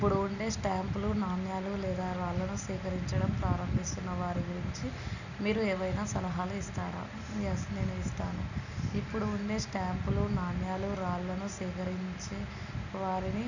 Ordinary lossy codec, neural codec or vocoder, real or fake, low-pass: none; none; real; 7.2 kHz